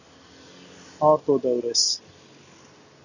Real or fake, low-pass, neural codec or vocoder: real; 7.2 kHz; none